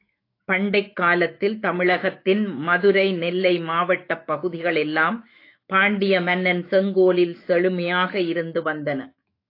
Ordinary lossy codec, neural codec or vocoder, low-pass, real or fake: AAC, 32 kbps; autoencoder, 48 kHz, 128 numbers a frame, DAC-VAE, trained on Japanese speech; 5.4 kHz; fake